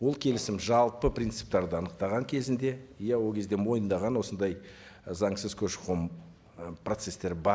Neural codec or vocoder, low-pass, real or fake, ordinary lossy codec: none; none; real; none